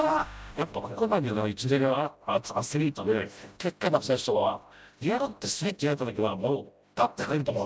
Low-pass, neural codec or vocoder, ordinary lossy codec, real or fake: none; codec, 16 kHz, 0.5 kbps, FreqCodec, smaller model; none; fake